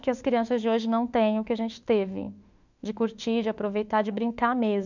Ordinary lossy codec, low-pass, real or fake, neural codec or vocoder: none; 7.2 kHz; fake; autoencoder, 48 kHz, 32 numbers a frame, DAC-VAE, trained on Japanese speech